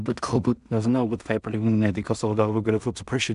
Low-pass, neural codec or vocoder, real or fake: 10.8 kHz; codec, 16 kHz in and 24 kHz out, 0.4 kbps, LongCat-Audio-Codec, two codebook decoder; fake